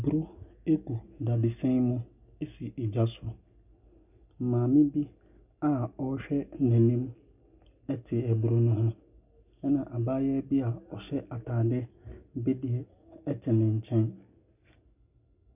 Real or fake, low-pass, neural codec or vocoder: real; 3.6 kHz; none